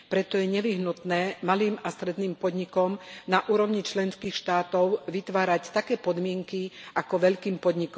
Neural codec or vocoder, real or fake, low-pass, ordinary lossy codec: none; real; none; none